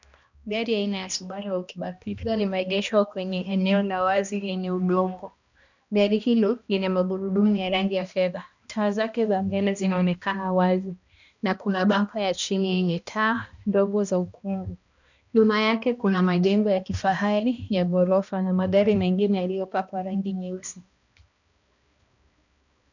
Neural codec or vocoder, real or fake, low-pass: codec, 16 kHz, 1 kbps, X-Codec, HuBERT features, trained on balanced general audio; fake; 7.2 kHz